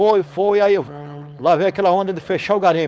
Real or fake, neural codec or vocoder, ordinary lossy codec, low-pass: fake; codec, 16 kHz, 4.8 kbps, FACodec; none; none